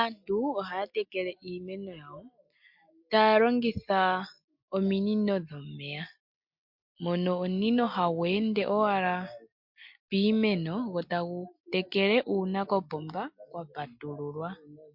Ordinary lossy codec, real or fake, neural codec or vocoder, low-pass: MP3, 48 kbps; real; none; 5.4 kHz